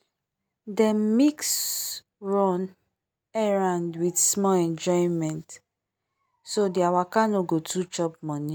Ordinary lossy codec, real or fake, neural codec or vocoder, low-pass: none; real; none; none